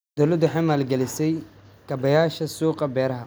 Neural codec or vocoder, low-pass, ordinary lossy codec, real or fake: none; none; none; real